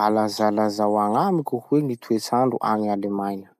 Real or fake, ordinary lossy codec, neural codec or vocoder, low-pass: real; none; none; 14.4 kHz